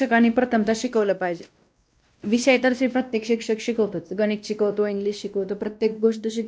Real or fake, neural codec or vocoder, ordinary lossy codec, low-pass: fake; codec, 16 kHz, 1 kbps, X-Codec, WavLM features, trained on Multilingual LibriSpeech; none; none